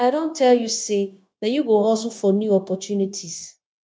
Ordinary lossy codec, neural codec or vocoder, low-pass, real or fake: none; codec, 16 kHz, 0.9 kbps, LongCat-Audio-Codec; none; fake